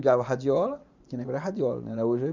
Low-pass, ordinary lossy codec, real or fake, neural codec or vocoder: 7.2 kHz; none; fake; vocoder, 44.1 kHz, 128 mel bands every 512 samples, BigVGAN v2